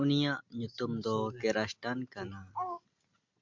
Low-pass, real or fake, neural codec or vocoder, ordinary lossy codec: 7.2 kHz; real; none; MP3, 64 kbps